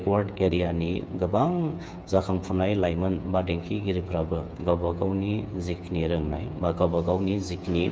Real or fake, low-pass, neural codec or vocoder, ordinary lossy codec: fake; none; codec, 16 kHz, 8 kbps, FreqCodec, smaller model; none